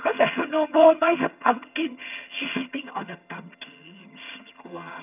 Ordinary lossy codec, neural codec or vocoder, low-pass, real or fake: none; vocoder, 22.05 kHz, 80 mel bands, HiFi-GAN; 3.6 kHz; fake